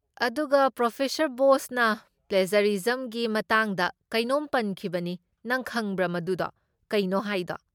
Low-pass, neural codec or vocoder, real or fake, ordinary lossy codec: 19.8 kHz; none; real; MP3, 96 kbps